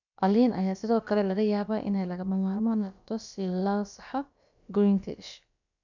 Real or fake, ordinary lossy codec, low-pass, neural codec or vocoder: fake; none; 7.2 kHz; codec, 16 kHz, about 1 kbps, DyCAST, with the encoder's durations